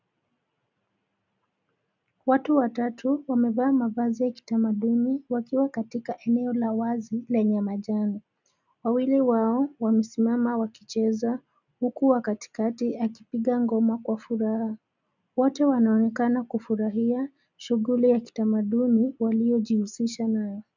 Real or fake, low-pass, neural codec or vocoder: real; 7.2 kHz; none